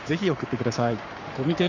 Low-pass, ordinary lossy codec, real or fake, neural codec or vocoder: 7.2 kHz; none; fake; codec, 44.1 kHz, 7.8 kbps, Pupu-Codec